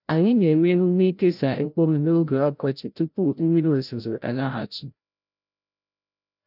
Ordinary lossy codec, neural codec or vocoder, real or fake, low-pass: none; codec, 16 kHz, 0.5 kbps, FreqCodec, larger model; fake; 5.4 kHz